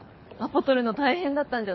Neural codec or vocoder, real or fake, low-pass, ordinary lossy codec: codec, 24 kHz, 6 kbps, HILCodec; fake; 7.2 kHz; MP3, 24 kbps